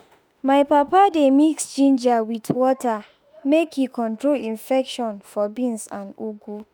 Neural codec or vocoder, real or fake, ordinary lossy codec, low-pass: autoencoder, 48 kHz, 32 numbers a frame, DAC-VAE, trained on Japanese speech; fake; none; none